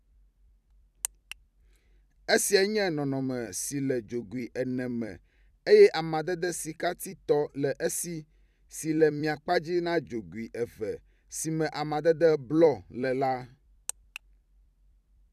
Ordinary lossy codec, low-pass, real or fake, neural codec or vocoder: none; 14.4 kHz; fake; vocoder, 44.1 kHz, 128 mel bands every 256 samples, BigVGAN v2